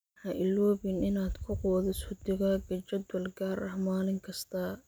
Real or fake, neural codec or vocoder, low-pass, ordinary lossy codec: real; none; none; none